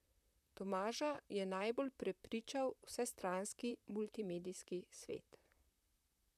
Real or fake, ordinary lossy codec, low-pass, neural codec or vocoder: fake; none; 14.4 kHz; vocoder, 44.1 kHz, 128 mel bands, Pupu-Vocoder